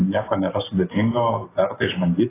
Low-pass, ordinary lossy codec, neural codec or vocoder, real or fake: 3.6 kHz; AAC, 16 kbps; none; real